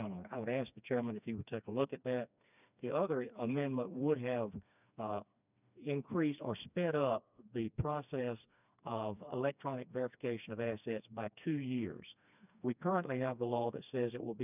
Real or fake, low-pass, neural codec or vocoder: fake; 3.6 kHz; codec, 16 kHz, 2 kbps, FreqCodec, smaller model